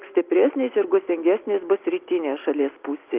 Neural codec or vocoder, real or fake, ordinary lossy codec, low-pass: none; real; Opus, 32 kbps; 3.6 kHz